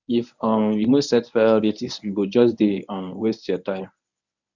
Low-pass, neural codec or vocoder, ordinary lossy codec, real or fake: 7.2 kHz; codec, 24 kHz, 0.9 kbps, WavTokenizer, medium speech release version 1; none; fake